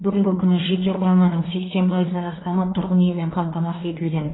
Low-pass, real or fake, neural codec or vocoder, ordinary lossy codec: 7.2 kHz; fake; codec, 24 kHz, 1 kbps, SNAC; AAC, 16 kbps